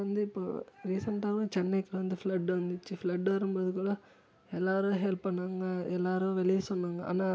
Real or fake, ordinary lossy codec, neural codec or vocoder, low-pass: real; none; none; none